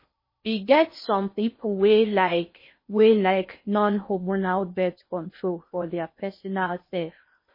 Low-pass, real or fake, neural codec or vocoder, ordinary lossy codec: 5.4 kHz; fake; codec, 16 kHz in and 24 kHz out, 0.6 kbps, FocalCodec, streaming, 4096 codes; MP3, 24 kbps